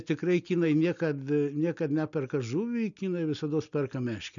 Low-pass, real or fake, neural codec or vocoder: 7.2 kHz; real; none